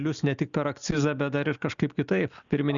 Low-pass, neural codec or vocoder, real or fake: 7.2 kHz; none; real